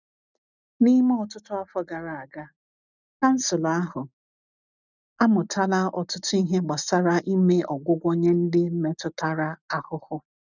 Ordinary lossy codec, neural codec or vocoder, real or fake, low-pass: none; none; real; 7.2 kHz